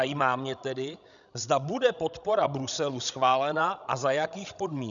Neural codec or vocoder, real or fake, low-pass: codec, 16 kHz, 16 kbps, FreqCodec, larger model; fake; 7.2 kHz